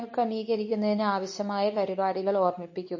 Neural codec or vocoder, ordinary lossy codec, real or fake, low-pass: codec, 24 kHz, 0.9 kbps, WavTokenizer, medium speech release version 2; MP3, 32 kbps; fake; 7.2 kHz